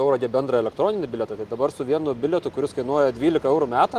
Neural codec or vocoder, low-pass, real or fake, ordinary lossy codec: none; 14.4 kHz; real; Opus, 24 kbps